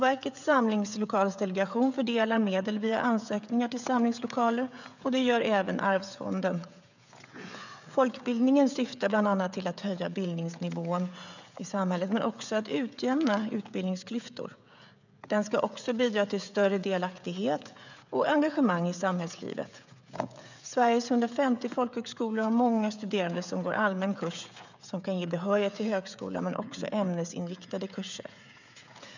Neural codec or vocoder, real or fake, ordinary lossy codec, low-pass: codec, 16 kHz, 16 kbps, FreqCodec, smaller model; fake; none; 7.2 kHz